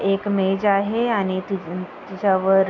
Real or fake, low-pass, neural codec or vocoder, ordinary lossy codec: real; 7.2 kHz; none; none